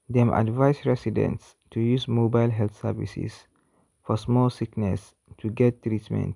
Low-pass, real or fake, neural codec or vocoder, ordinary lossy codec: 10.8 kHz; real; none; none